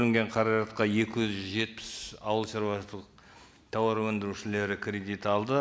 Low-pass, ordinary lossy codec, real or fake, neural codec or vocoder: none; none; real; none